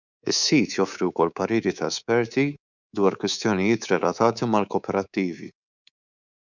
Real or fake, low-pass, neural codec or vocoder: fake; 7.2 kHz; codec, 24 kHz, 3.1 kbps, DualCodec